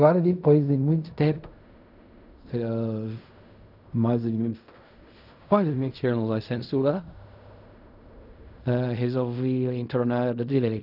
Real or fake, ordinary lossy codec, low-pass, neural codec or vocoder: fake; none; 5.4 kHz; codec, 16 kHz in and 24 kHz out, 0.4 kbps, LongCat-Audio-Codec, fine tuned four codebook decoder